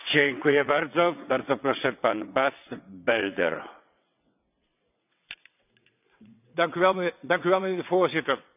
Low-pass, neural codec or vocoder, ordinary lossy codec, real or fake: 3.6 kHz; vocoder, 22.05 kHz, 80 mel bands, WaveNeXt; none; fake